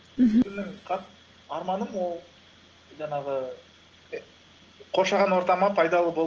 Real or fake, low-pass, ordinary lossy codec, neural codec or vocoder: real; 7.2 kHz; Opus, 16 kbps; none